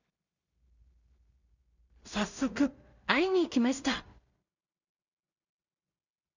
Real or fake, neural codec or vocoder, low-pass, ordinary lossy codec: fake; codec, 16 kHz in and 24 kHz out, 0.4 kbps, LongCat-Audio-Codec, two codebook decoder; 7.2 kHz; none